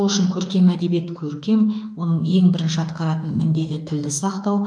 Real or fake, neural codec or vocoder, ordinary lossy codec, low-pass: fake; autoencoder, 48 kHz, 32 numbers a frame, DAC-VAE, trained on Japanese speech; none; 9.9 kHz